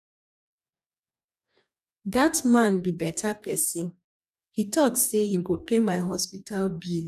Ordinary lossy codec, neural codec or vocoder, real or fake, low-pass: none; codec, 44.1 kHz, 2.6 kbps, DAC; fake; 14.4 kHz